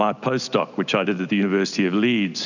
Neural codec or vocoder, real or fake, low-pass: none; real; 7.2 kHz